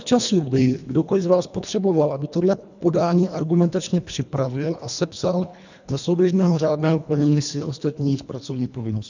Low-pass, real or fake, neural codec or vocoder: 7.2 kHz; fake; codec, 24 kHz, 1.5 kbps, HILCodec